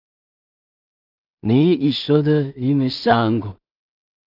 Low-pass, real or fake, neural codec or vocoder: 5.4 kHz; fake; codec, 16 kHz in and 24 kHz out, 0.4 kbps, LongCat-Audio-Codec, two codebook decoder